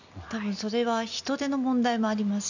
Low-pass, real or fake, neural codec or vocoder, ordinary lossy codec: 7.2 kHz; real; none; none